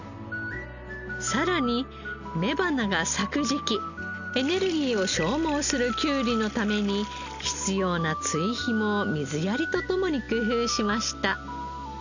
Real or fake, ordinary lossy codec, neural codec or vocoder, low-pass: real; none; none; 7.2 kHz